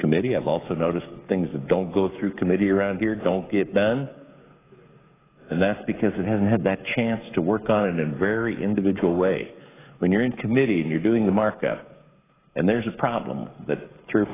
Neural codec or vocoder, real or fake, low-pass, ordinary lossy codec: codec, 16 kHz, 16 kbps, FreqCodec, smaller model; fake; 3.6 kHz; AAC, 16 kbps